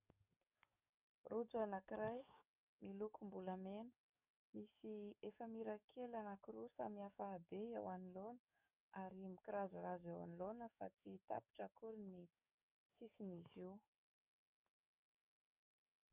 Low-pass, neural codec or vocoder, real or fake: 3.6 kHz; codec, 44.1 kHz, 7.8 kbps, DAC; fake